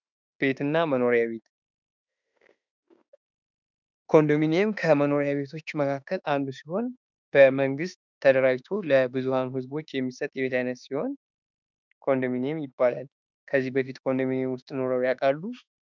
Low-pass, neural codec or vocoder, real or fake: 7.2 kHz; autoencoder, 48 kHz, 32 numbers a frame, DAC-VAE, trained on Japanese speech; fake